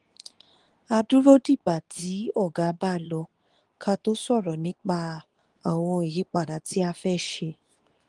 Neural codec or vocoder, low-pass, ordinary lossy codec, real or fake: codec, 24 kHz, 0.9 kbps, WavTokenizer, medium speech release version 2; 10.8 kHz; Opus, 24 kbps; fake